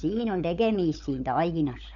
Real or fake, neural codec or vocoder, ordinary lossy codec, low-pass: fake; codec, 16 kHz, 16 kbps, FunCodec, trained on LibriTTS, 50 frames a second; none; 7.2 kHz